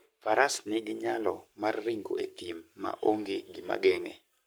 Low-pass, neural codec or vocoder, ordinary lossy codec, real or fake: none; codec, 44.1 kHz, 7.8 kbps, Pupu-Codec; none; fake